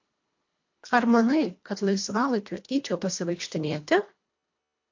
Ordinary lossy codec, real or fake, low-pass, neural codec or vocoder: MP3, 48 kbps; fake; 7.2 kHz; codec, 24 kHz, 1.5 kbps, HILCodec